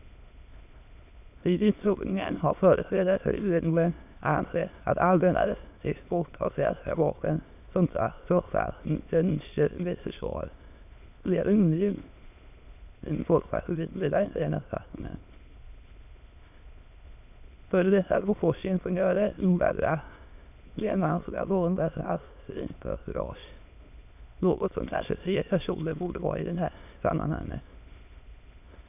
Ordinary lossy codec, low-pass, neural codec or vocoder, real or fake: none; 3.6 kHz; autoencoder, 22.05 kHz, a latent of 192 numbers a frame, VITS, trained on many speakers; fake